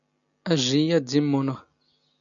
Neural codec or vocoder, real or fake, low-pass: none; real; 7.2 kHz